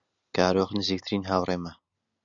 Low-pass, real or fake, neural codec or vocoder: 7.2 kHz; real; none